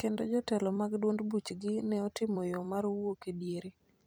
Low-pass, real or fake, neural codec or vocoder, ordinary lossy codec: none; fake; vocoder, 44.1 kHz, 128 mel bands every 256 samples, BigVGAN v2; none